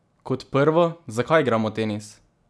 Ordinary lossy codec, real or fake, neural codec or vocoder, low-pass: none; real; none; none